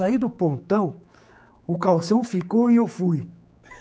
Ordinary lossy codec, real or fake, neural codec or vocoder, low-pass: none; fake; codec, 16 kHz, 4 kbps, X-Codec, HuBERT features, trained on general audio; none